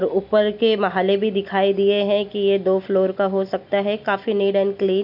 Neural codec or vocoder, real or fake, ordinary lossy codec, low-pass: none; real; none; 5.4 kHz